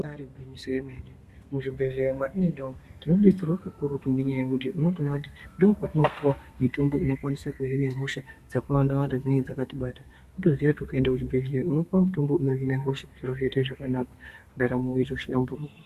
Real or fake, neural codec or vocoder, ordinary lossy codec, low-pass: fake; codec, 32 kHz, 1.9 kbps, SNAC; Opus, 64 kbps; 14.4 kHz